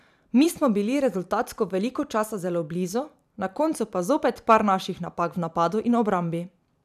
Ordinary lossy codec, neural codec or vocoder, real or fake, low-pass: none; vocoder, 44.1 kHz, 128 mel bands every 256 samples, BigVGAN v2; fake; 14.4 kHz